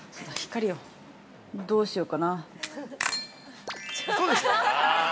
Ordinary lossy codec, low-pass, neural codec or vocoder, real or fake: none; none; none; real